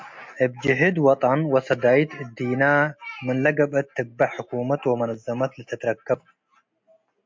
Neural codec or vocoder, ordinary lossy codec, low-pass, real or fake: none; MP3, 48 kbps; 7.2 kHz; real